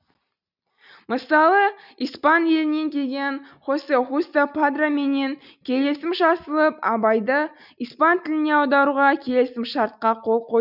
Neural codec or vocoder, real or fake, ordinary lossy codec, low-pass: none; real; none; 5.4 kHz